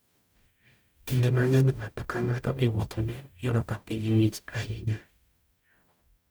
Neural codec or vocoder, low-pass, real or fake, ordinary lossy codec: codec, 44.1 kHz, 0.9 kbps, DAC; none; fake; none